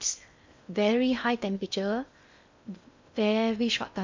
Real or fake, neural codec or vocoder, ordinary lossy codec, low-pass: fake; codec, 16 kHz in and 24 kHz out, 0.8 kbps, FocalCodec, streaming, 65536 codes; MP3, 64 kbps; 7.2 kHz